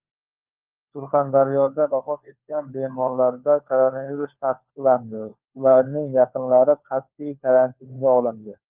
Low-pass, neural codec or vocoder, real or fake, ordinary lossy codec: 3.6 kHz; codec, 16 kHz, 4 kbps, FunCodec, trained on LibriTTS, 50 frames a second; fake; Opus, 32 kbps